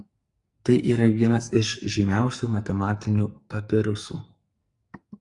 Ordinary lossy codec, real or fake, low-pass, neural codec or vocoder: Opus, 64 kbps; fake; 10.8 kHz; codec, 44.1 kHz, 2.6 kbps, SNAC